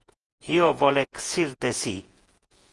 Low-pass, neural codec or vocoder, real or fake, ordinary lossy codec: 10.8 kHz; vocoder, 48 kHz, 128 mel bands, Vocos; fake; Opus, 24 kbps